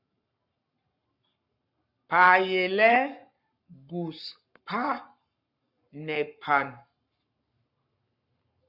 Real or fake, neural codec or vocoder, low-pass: fake; codec, 44.1 kHz, 7.8 kbps, Pupu-Codec; 5.4 kHz